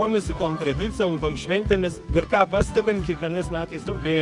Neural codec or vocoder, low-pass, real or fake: codec, 24 kHz, 0.9 kbps, WavTokenizer, medium music audio release; 10.8 kHz; fake